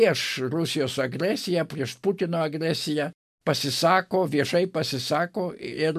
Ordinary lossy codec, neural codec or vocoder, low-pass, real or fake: MP3, 96 kbps; none; 14.4 kHz; real